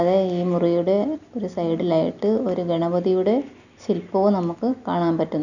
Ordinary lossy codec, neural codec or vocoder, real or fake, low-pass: none; none; real; 7.2 kHz